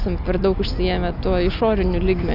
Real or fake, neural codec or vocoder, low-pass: real; none; 5.4 kHz